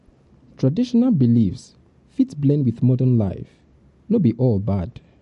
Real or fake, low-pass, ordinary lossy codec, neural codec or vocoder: real; 14.4 kHz; MP3, 48 kbps; none